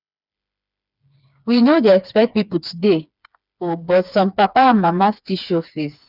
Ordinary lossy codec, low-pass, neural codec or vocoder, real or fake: none; 5.4 kHz; codec, 16 kHz, 4 kbps, FreqCodec, smaller model; fake